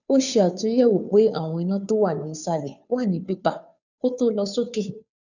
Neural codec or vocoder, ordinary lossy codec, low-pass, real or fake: codec, 16 kHz, 2 kbps, FunCodec, trained on Chinese and English, 25 frames a second; none; 7.2 kHz; fake